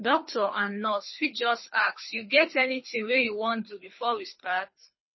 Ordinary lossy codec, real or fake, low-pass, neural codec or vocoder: MP3, 24 kbps; fake; 7.2 kHz; codec, 24 kHz, 3 kbps, HILCodec